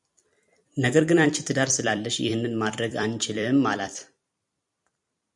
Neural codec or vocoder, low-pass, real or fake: vocoder, 44.1 kHz, 128 mel bands every 256 samples, BigVGAN v2; 10.8 kHz; fake